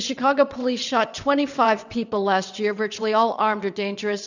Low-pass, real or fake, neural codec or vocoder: 7.2 kHz; fake; vocoder, 22.05 kHz, 80 mel bands, WaveNeXt